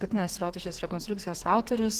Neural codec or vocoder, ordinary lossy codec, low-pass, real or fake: codec, 44.1 kHz, 2.6 kbps, SNAC; Opus, 16 kbps; 14.4 kHz; fake